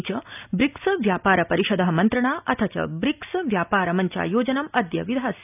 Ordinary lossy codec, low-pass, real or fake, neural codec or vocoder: none; 3.6 kHz; real; none